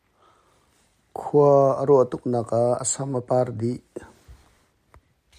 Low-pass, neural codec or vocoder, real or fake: 14.4 kHz; none; real